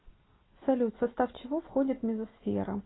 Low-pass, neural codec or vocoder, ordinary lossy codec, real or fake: 7.2 kHz; none; AAC, 16 kbps; real